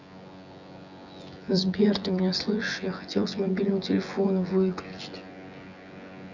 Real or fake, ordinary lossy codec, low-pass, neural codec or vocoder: fake; none; 7.2 kHz; vocoder, 24 kHz, 100 mel bands, Vocos